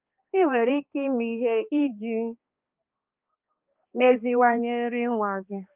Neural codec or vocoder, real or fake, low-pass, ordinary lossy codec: codec, 16 kHz, 2 kbps, X-Codec, HuBERT features, trained on balanced general audio; fake; 3.6 kHz; Opus, 32 kbps